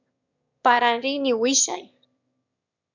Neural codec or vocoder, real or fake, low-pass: autoencoder, 22.05 kHz, a latent of 192 numbers a frame, VITS, trained on one speaker; fake; 7.2 kHz